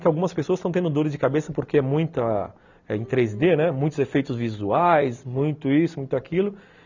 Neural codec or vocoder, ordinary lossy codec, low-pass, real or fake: none; none; 7.2 kHz; real